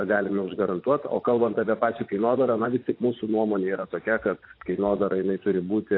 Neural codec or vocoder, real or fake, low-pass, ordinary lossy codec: vocoder, 24 kHz, 100 mel bands, Vocos; fake; 5.4 kHz; AAC, 32 kbps